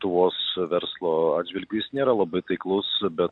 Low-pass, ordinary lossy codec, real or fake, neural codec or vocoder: 9.9 kHz; AAC, 64 kbps; real; none